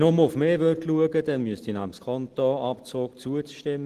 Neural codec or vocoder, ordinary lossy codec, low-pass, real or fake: none; Opus, 16 kbps; 14.4 kHz; real